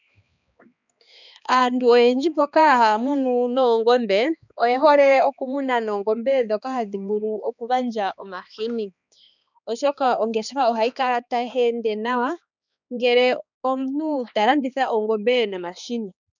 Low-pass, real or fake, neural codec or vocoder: 7.2 kHz; fake; codec, 16 kHz, 2 kbps, X-Codec, HuBERT features, trained on balanced general audio